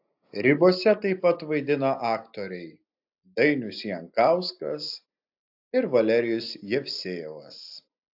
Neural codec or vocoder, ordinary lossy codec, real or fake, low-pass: none; AAC, 48 kbps; real; 5.4 kHz